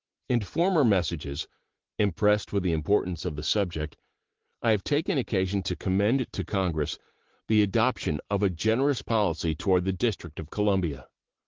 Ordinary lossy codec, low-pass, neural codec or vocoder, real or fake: Opus, 16 kbps; 7.2 kHz; none; real